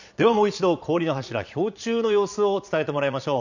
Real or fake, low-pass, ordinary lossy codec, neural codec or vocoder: fake; 7.2 kHz; none; vocoder, 22.05 kHz, 80 mel bands, Vocos